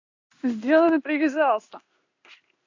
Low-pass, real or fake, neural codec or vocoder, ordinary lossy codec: 7.2 kHz; fake; codec, 16 kHz in and 24 kHz out, 1 kbps, XY-Tokenizer; AAC, 48 kbps